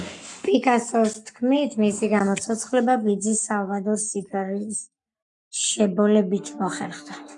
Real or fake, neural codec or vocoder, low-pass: fake; codec, 44.1 kHz, 7.8 kbps, Pupu-Codec; 10.8 kHz